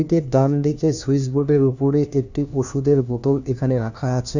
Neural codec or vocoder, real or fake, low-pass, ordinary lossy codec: codec, 16 kHz, 1 kbps, FunCodec, trained on LibriTTS, 50 frames a second; fake; 7.2 kHz; none